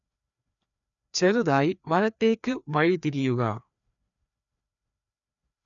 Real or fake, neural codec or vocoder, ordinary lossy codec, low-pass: fake; codec, 16 kHz, 2 kbps, FreqCodec, larger model; none; 7.2 kHz